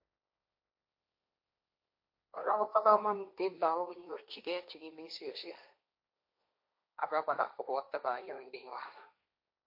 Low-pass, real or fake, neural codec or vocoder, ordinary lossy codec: 5.4 kHz; fake; codec, 16 kHz, 1.1 kbps, Voila-Tokenizer; MP3, 32 kbps